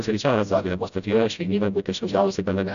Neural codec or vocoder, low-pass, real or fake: codec, 16 kHz, 0.5 kbps, FreqCodec, smaller model; 7.2 kHz; fake